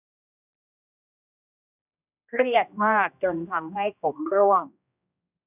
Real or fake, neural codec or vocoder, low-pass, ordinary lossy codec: fake; codec, 16 kHz, 1 kbps, X-Codec, HuBERT features, trained on general audio; 3.6 kHz; none